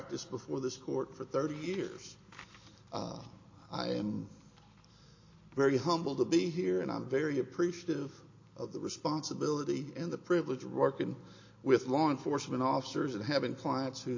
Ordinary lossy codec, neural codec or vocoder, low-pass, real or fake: MP3, 32 kbps; none; 7.2 kHz; real